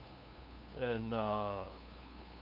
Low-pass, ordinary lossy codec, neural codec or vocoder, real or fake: 5.4 kHz; none; codec, 16 kHz, 2 kbps, FunCodec, trained on LibriTTS, 25 frames a second; fake